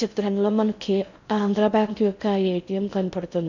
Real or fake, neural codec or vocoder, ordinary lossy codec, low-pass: fake; codec, 16 kHz in and 24 kHz out, 0.6 kbps, FocalCodec, streaming, 4096 codes; none; 7.2 kHz